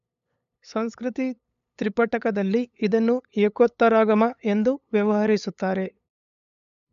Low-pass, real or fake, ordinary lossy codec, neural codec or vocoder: 7.2 kHz; fake; none; codec, 16 kHz, 8 kbps, FunCodec, trained on LibriTTS, 25 frames a second